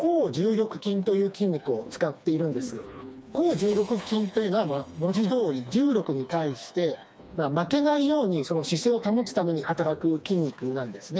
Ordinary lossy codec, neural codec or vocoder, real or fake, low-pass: none; codec, 16 kHz, 2 kbps, FreqCodec, smaller model; fake; none